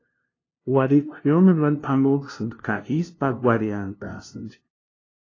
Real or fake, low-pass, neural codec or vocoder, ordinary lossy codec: fake; 7.2 kHz; codec, 16 kHz, 0.5 kbps, FunCodec, trained on LibriTTS, 25 frames a second; AAC, 32 kbps